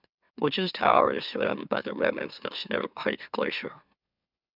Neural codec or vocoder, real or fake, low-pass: autoencoder, 44.1 kHz, a latent of 192 numbers a frame, MeloTTS; fake; 5.4 kHz